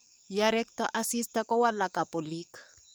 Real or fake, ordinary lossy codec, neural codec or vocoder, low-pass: fake; none; codec, 44.1 kHz, 7.8 kbps, DAC; none